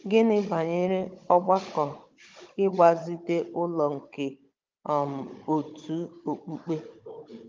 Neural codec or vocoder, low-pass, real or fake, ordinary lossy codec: codec, 16 kHz, 16 kbps, FunCodec, trained on Chinese and English, 50 frames a second; 7.2 kHz; fake; Opus, 24 kbps